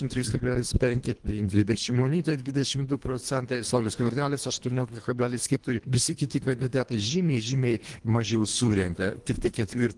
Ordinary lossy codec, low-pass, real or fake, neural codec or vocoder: Opus, 24 kbps; 10.8 kHz; fake; codec, 24 kHz, 1.5 kbps, HILCodec